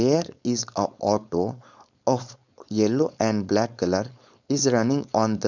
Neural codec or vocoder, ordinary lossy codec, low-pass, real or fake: codec, 16 kHz, 4.8 kbps, FACodec; none; 7.2 kHz; fake